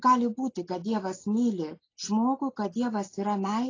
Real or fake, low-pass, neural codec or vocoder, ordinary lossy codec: real; 7.2 kHz; none; AAC, 32 kbps